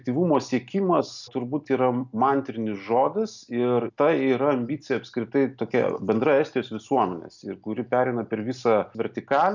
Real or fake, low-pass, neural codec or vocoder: real; 7.2 kHz; none